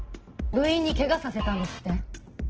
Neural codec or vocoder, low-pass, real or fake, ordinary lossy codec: none; 7.2 kHz; real; Opus, 16 kbps